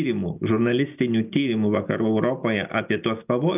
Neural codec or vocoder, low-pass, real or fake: none; 3.6 kHz; real